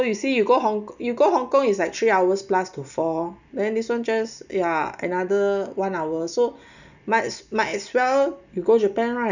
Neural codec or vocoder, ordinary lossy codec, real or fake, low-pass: none; none; real; 7.2 kHz